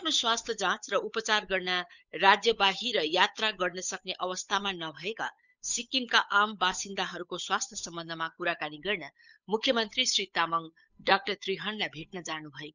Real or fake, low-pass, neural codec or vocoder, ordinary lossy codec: fake; 7.2 kHz; codec, 16 kHz, 8 kbps, FunCodec, trained on Chinese and English, 25 frames a second; none